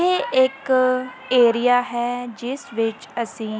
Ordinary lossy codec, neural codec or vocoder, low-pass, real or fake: none; none; none; real